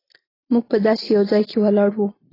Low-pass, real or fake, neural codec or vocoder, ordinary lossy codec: 5.4 kHz; real; none; AAC, 24 kbps